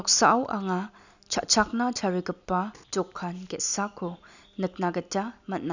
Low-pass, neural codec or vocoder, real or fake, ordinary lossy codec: 7.2 kHz; none; real; none